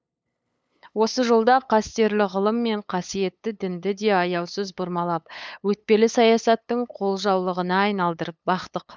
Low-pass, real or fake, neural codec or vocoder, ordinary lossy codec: none; fake; codec, 16 kHz, 8 kbps, FunCodec, trained on LibriTTS, 25 frames a second; none